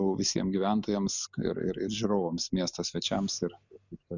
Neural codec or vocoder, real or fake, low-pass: none; real; 7.2 kHz